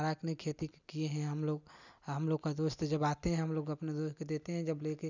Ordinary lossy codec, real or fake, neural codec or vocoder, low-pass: none; real; none; 7.2 kHz